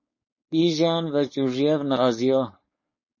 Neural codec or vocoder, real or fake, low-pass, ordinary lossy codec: codec, 16 kHz, 4.8 kbps, FACodec; fake; 7.2 kHz; MP3, 32 kbps